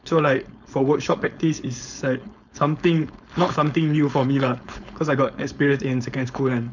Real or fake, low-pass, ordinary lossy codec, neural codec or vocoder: fake; 7.2 kHz; none; codec, 16 kHz, 4.8 kbps, FACodec